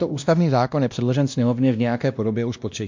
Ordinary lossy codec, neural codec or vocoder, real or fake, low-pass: MP3, 64 kbps; codec, 16 kHz, 1 kbps, X-Codec, WavLM features, trained on Multilingual LibriSpeech; fake; 7.2 kHz